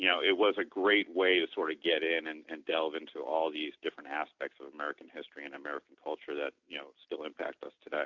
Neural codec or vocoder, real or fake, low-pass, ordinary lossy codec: none; real; 7.2 kHz; Opus, 64 kbps